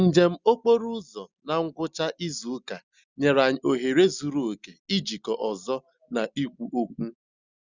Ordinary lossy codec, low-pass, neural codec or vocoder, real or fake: Opus, 64 kbps; 7.2 kHz; none; real